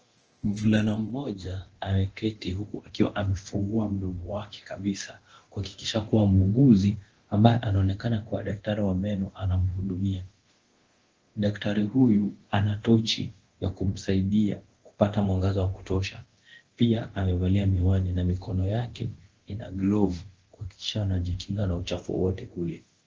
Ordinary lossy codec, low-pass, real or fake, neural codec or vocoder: Opus, 16 kbps; 7.2 kHz; fake; codec, 24 kHz, 0.9 kbps, DualCodec